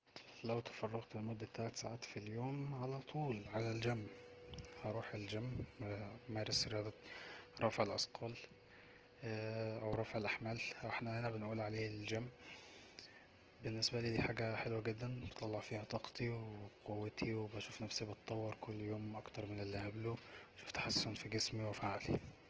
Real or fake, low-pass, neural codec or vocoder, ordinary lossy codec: real; 7.2 kHz; none; Opus, 16 kbps